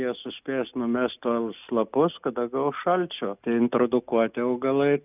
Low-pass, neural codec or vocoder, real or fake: 3.6 kHz; none; real